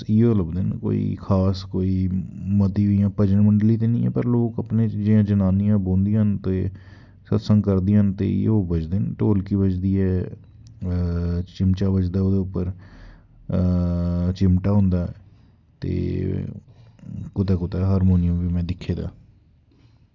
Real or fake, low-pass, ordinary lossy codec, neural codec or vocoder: real; 7.2 kHz; Opus, 64 kbps; none